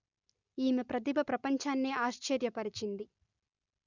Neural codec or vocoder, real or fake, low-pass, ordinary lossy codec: none; real; 7.2 kHz; none